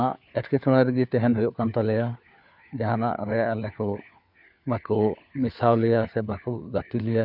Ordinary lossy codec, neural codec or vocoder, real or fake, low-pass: none; codec, 16 kHz, 4 kbps, FunCodec, trained on LibriTTS, 50 frames a second; fake; 5.4 kHz